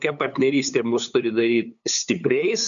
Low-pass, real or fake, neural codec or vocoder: 7.2 kHz; fake; codec, 16 kHz, 8 kbps, FunCodec, trained on LibriTTS, 25 frames a second